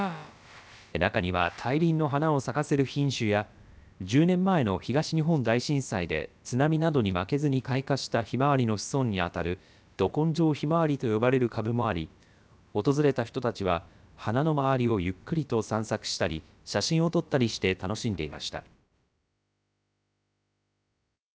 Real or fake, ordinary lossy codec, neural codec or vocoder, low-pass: fake; none; codec, 16 kHz, about 1 kbps, DyCAST, with the encoder's durations; none